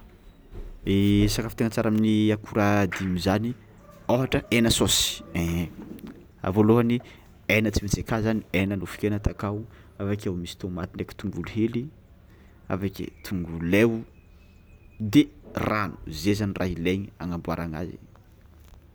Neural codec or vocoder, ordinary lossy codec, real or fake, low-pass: none; none; real; none